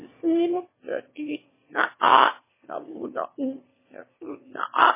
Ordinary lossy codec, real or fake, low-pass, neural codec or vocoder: MP3, 16 kbps; fake; 3.6 kHz; autoencoder, 22.05 kHz, a latent of 192 numbers a frame, VITS, trained on one speaker